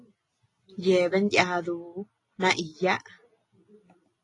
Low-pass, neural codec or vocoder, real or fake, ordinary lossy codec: 10.8 kHz; none; real; AAC, 32 kbps